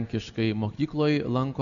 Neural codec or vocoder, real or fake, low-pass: none; real; 7.2 kHz